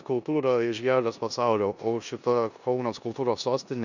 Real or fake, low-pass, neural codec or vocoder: fake; 7.2 kHz; codec, 16 kHz in and 24 kHz out, 0.9 kbps, LongCat-Audio-Codec, four codebook decoder